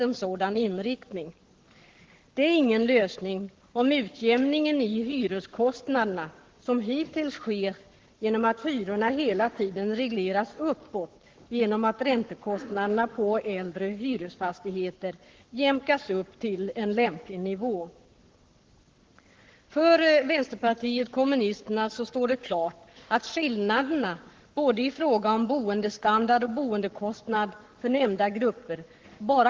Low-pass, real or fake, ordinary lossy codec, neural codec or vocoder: 7.2 kHz; fake; Opus, 16 kbps; codec, 44.1 kHz, 7.8 kbps, Pupu-Codec